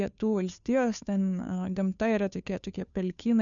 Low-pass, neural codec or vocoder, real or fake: 7.2 kHz; codec, 16 kHz, 2 kbps, FunCodec, trained on Chinese and English, 25 frames a second; fake